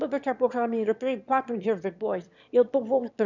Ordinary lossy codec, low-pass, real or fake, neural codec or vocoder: none; 7.2 kHz; fake; autoencoder, 22.05 kHz, a latent of 192 numbers a frame, VITS, trained on one speaker